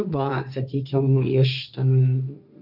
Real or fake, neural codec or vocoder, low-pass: fake; codec, 16 kHz, 1.1 kbps, Voila-Tokenizer; 5.4 kHz